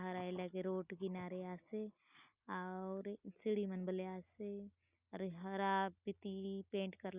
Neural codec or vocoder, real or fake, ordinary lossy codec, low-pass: none; real; Opus, 64 kbps; 3.6 kHz